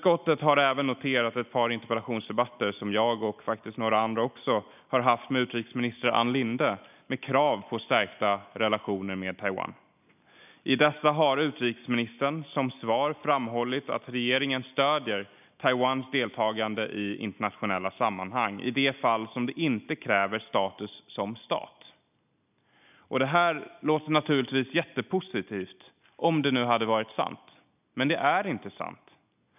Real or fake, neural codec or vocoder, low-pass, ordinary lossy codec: real; none; 3.6 kHz; none